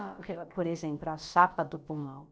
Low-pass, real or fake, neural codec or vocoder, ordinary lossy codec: none; fake; codec, 16 kHz, about 1 kbps, DyCAST, with the encoder's durations; none